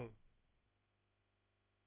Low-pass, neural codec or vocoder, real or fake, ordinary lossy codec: 3.6 kHz; codec, 16 kHz, about 1 kbps, DyCAST, with the encoder's durations; fake; Opus, 24 kbps